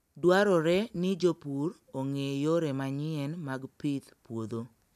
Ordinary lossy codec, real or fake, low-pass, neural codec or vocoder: none; real; 14.4 kHz; none